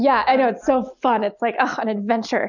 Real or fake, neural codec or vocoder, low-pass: real; none; 7.2 kHz